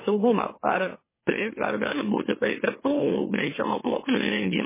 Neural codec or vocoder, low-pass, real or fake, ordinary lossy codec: autoencoder, 44.1 kHz, a latent of 192 numbers a frame, MeloTTS; 3.6 kHz; fake; MP3, 16 kbps